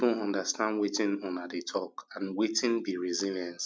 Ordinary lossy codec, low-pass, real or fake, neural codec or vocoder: none; none; real; none